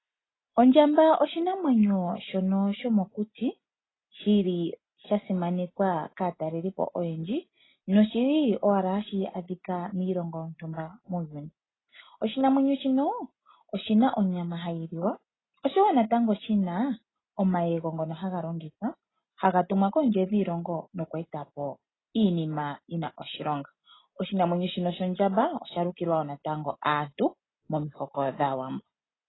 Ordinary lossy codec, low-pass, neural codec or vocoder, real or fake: AAC, 16 kbps; 7.2 kHz; none; real